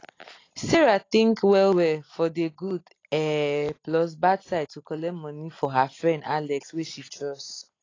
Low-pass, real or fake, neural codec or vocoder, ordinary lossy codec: 7.2 kHz; real; none; AAC, 32 kbps